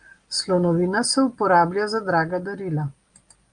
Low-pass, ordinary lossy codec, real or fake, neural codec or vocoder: 9.9 kHz; Opus, 32 kbps; real; none